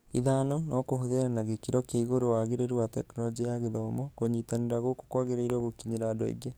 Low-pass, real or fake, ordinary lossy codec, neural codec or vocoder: none; fake; none; codec, 44.1 kHz, 7.8 kbps, DAC